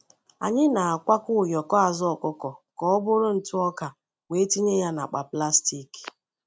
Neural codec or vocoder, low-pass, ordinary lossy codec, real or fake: none; none; none; real